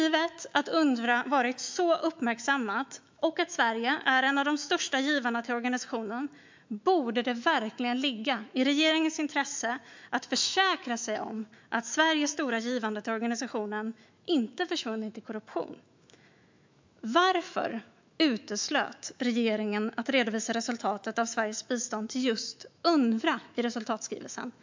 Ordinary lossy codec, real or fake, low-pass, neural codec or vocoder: MP3, 64 kbps; fake; 7.2 kHz; autoencoder, 48 kHz, 128 numbers a frame, DAC-VAE, trained on Japanese speech